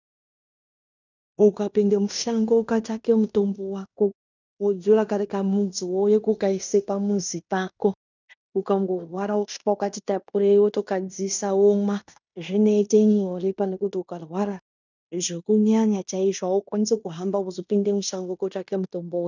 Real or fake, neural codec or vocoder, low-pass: fake; codec, 16 kHz in and 24 kHz out, 0.9 kbps, LongCat-Audio-Codec, fine tuned four codebook decoder; 7.2 kHz